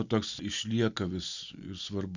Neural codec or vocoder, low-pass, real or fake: none; 7.2 kHz; real